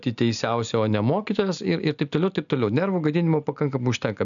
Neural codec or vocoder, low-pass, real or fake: none; 7.2 kHz; real